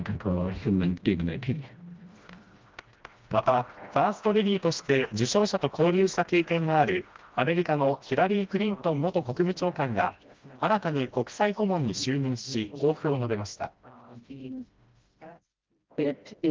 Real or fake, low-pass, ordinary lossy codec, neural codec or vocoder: fake; 7.2 kHz; Opus, 24 kbps; codec, 16 kHz, 1 kbps, FreqCodec, smaller model